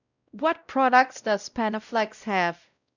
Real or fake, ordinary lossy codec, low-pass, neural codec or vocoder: fake; none; 7.2 kHz; codec, 16 kHz, 0.5 kbps, X-Codec, WavLM features, trained on Multilingual LibriSpeech